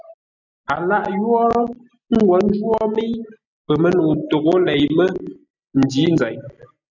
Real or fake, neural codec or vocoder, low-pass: real; none; 7.2 kHz